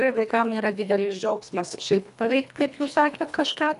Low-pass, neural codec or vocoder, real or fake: 10.8 kHz; codec, 24 kHz, 1.5 kbps, HILCodec; fake